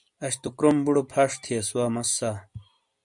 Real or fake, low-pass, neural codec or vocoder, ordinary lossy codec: real; 10.8 kHz; none; MP3, 96 kbps